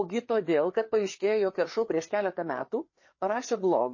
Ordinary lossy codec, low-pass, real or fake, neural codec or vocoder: MP3, 32 kbps; 7.2 kHz; fake; codec, 16 kHz, 4 kbps, FreqCodec, larger model